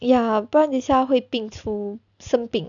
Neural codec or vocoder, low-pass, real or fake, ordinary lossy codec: none; 7.2 kHz; real; none